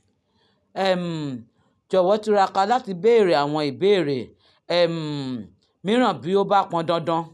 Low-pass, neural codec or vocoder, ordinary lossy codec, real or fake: none; none; none; real